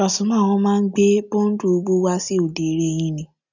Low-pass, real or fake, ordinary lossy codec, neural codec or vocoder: 7.2 kHz; real; none; none